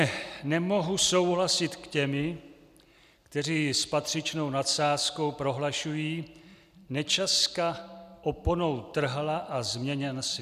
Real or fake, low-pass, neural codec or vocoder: real; 14.4 kHz; none